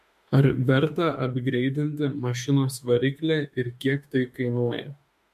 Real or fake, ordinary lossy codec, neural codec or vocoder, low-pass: fake; MP3, 64 kbps; autoencoder, 48 kHz, 32 numbers a frame, DAC-VAE, trained on Japanese speech; 14.4 kHz